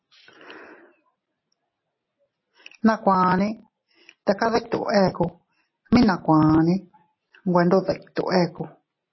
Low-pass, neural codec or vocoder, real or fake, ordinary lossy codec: 7.2 kHz; none; real; MP3, 24 kbps